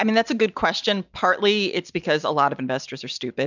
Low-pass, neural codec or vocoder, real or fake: 7.2 kHz; none; real